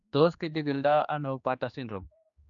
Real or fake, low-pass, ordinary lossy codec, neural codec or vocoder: fake; 7.2 kHz; none; codec, 16 kHz, 2 kbps, X-Codec, HuBERT features, trained on general audio